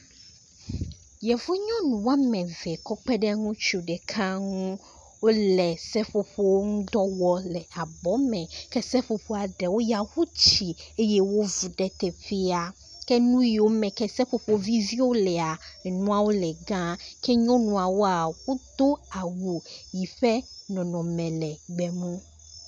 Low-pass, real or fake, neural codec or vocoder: 10.8 kHz; real; none